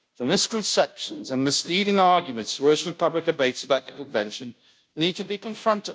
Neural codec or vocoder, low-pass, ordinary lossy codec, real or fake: codec, 16 kHz, 0.5 kbps, FunCodec, trained on Chinese and English, 25 frames a second; none; none; fake